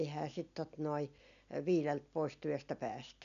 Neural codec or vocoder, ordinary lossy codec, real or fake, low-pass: none; none; real; 7.2 kHz